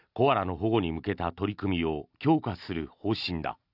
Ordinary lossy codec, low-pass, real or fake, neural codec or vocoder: none; 5.4 kHz; real; none